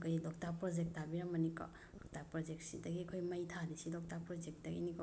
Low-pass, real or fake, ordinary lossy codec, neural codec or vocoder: none; real; none; none